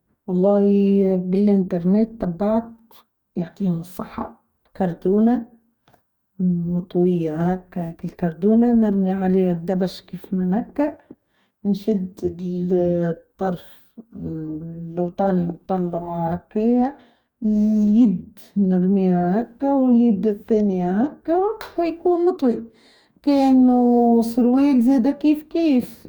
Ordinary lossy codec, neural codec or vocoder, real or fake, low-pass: none; codec, 44.1 kHz, 2.6 kbps, DAC; fake; 19.8 kHz